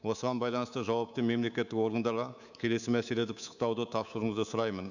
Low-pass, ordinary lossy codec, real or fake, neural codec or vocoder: 7.2 kHz; none; fake; codec, 24 kHz, 3.1 kbps, DualCodec